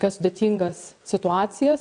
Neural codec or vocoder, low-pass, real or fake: vocoder, 22.05 kHz, 80 mel bands, WaveNeXt; 9.9 kHz; fake